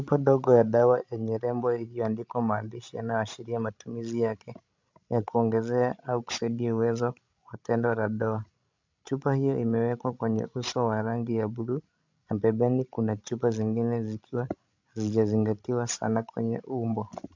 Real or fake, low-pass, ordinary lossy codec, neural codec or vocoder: fake; 7.2 kHz; MP3, 64 kbps; codec, 16 kHz, 16 kbps, FreqCodec, larger model